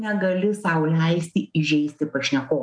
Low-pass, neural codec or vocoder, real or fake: 9.9 kHz; none; real